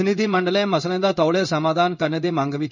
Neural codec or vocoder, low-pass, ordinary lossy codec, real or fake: codec, 16 kHz in and 24 kHz out, 1 kbps, XY-Tokenizer; 7.2 kHz; none; fake